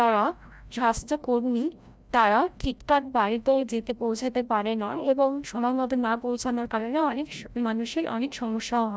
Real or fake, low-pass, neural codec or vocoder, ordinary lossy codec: fake; none; codec, 16 kHz, 0.5 kbps, FreqCodec, larger model; none